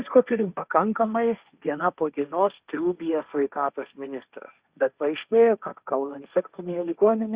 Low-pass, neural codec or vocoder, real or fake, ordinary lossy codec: 3.6 kHz; codec, 16 kHz, 1.1 kbps, Voila-Tokenizer; fake; Opus, 64 kbps